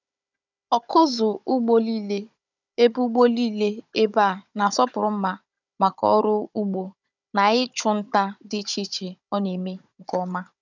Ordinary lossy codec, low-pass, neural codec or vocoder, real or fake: none; 7.2 kHz; codec, 16 kHz, 16 kbps, FunCodec, trained on Chinese and English, 50 frames a second; fake